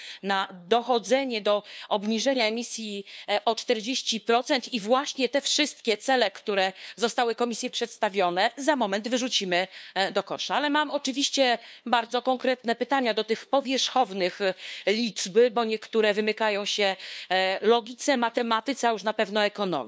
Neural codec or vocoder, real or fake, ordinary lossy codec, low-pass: codec, 16 kHz, 2 kbps, FunCodec, trained on LibriTTS, 25 frames a second; fake; none; none